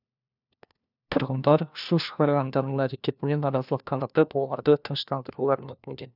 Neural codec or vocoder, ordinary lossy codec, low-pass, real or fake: codec, 16 kHz, 1 kbps, FunCodec, trained on LibriTTS, 50 frames a second; none; 5.4 kHz; fake